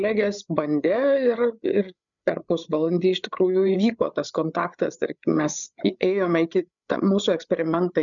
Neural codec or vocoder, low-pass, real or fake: codec, 16 kHz, 8 kbps, FreqCodec, larger model; 7.2 kHz; fake